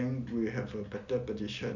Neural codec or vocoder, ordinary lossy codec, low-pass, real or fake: none; none; 7.2 kHz; real